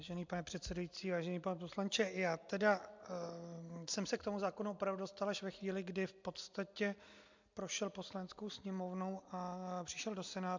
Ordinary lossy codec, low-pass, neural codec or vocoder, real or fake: MP3, 64 kbps; 7.2 kHz; none; real